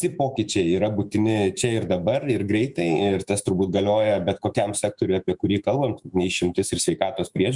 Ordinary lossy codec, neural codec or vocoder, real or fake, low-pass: Opus, 64 kbps; autoencoder, 48 kHz, 128 numbers a frame, DAC-VAE, trained on Japanese speech; fake; 10.8 kHz